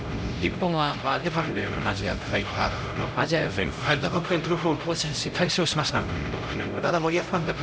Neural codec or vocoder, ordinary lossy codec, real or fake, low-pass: codec, 16 kHz, 0.5 kbps, X-Codec, HuBERT features, trained on LibriSpeech; none; fake; none